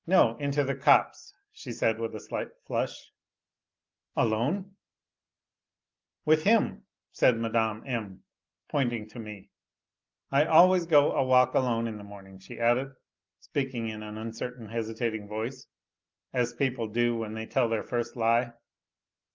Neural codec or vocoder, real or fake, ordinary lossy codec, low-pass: none; real; Opus, 24 kbps; 7.2 kHz